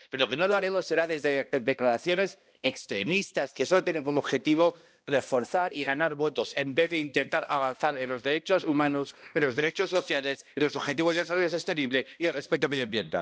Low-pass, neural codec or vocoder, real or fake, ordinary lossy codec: none; codec, 16 kHz, 1 kbps, X-Codec, HuBERT features, trained on balanced general audio; fake; none